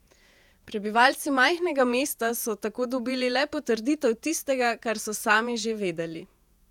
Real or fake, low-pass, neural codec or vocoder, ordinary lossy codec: fake; 19.8 kHz; vocoder, 48 kHz, 128 mel bands, Vocos; none